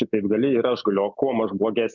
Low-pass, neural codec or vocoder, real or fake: 7.2 kHz; none; real